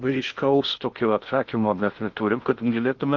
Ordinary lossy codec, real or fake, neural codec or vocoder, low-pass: Opus, 32 kbps; fake; codec, 16 kHz in and 24 kHz out, 0.6 kbps, FocalCodec, streaming, 2048 codes; 7.2 kHz